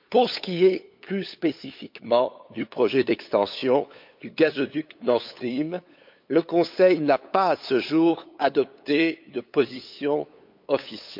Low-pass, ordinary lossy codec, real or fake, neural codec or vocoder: 5.4 kHz; MP3, 48 kbps; fake; codec, 16 kHz, 8 kbps, FunCodec, trained on LibriTTS, 25 frames a second